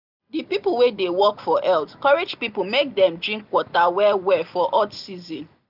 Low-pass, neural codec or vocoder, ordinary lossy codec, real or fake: 5.4 kHz; none; none; real